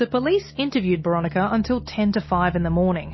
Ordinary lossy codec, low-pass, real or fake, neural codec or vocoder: MP3, 24 kbps; 7.2 kHz; real; none